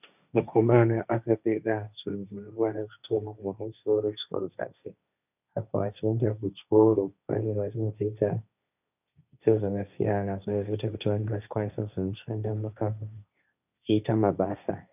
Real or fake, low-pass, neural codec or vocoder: fake; 3.6 kHz; codec, 16 kHz, 1.1 kbps, Voila-Tokenizer